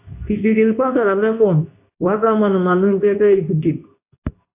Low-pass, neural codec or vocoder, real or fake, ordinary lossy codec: 3.6 kHz; codec, 24 kHz, 0.9 kbps, WavTokenizer, medium speech release version 2; fake; AAC, 32 kbps